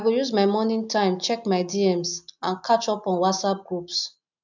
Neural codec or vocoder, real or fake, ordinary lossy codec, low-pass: none; real; none; 7.2 kHz